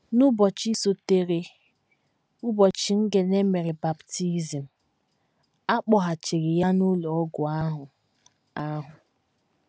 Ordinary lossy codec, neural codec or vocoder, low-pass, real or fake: none; none; none; real